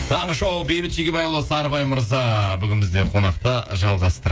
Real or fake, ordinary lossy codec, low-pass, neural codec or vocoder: fake; none; none; codec, 16 kHz, 8 kbps, FreqCodec, smaller model